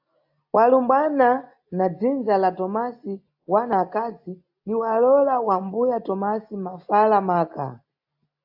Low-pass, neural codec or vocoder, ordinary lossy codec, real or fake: 5.4 kHz; none; AAC, 48 kbps; real